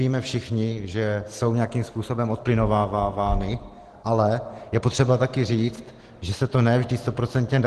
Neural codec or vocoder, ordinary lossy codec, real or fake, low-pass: none; Opus, 16 kbps; real; 10.8 kHz